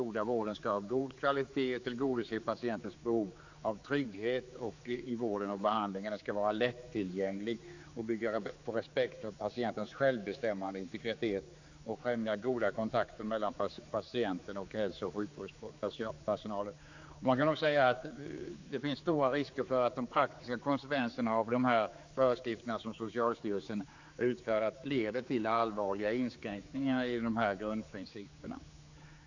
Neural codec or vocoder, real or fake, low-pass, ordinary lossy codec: codec, 16 kHz, 4 kbps, X-Codec, HuBERT features, trained on general audio; fake; 7.2 kHz; AAC, 48 kbps